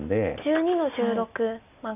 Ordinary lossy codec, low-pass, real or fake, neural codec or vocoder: none; 3.6 kHz; real; none